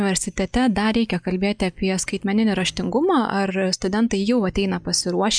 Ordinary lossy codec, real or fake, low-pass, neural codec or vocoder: MP3, 96 kbps; real; 9.9 kHz; none